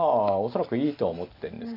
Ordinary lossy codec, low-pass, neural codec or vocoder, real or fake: AAC, 32 kbps; 5.4 kHz; none; real